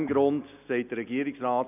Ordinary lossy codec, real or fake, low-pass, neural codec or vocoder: none; real; 3.6 kHz; none